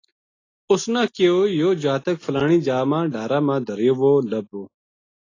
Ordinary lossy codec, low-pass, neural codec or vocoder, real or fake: AAC, 32 kbps; 7.2 kHz; none; real